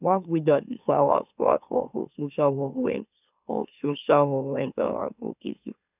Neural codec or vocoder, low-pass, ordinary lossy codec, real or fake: autoencoder, 44.1 kHz, a latent of 192 numbers a frame, MeloTTS; 3.6 kHz; none; fake